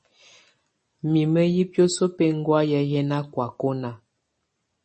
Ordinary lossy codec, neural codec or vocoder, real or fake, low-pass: MP3, 32 kbps; none; real; 9.9 kHz